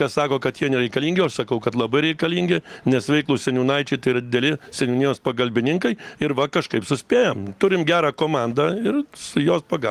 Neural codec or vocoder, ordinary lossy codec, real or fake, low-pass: none; Opus, 24 kbps; real; 14.4 kHz